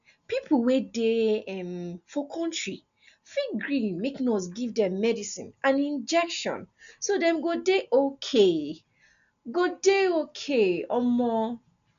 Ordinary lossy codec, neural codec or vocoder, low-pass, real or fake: none; none; 7.2 kHz; real